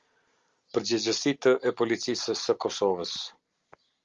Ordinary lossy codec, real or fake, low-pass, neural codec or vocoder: Opus, 32 kbps; real; 7.2 kHz; none